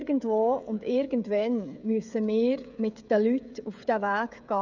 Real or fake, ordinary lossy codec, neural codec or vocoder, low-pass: fake; none; codec, 16 kHz, 16 kbps, FreqCodec, smaller model; 7.2 kHz